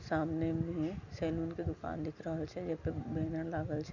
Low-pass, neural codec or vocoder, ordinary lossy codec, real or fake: 7.2 kHz; none; none; real